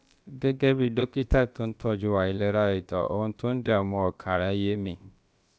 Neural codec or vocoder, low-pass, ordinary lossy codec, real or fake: codec, 16 kHz, about 1 kbps, DyCAST, with the encoder's durations; none; none; fake